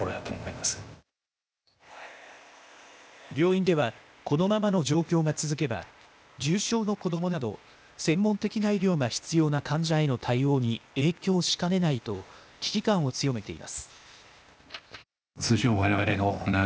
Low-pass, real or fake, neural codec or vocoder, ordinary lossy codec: none; fake; codec, 16 kHz, 0.8 kbps, ZipCodec; none